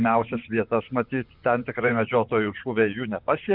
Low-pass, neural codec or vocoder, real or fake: 5.4 kHz; vocoder, 24 kHz, 100 mel bands, Vocos; fake